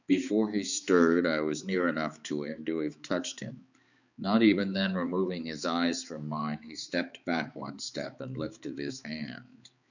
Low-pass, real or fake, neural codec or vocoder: 7.2 kHz; fake; codec, 16 kHz, 4 kbps, X-Codec, HuBERT features, trained on balanced general audio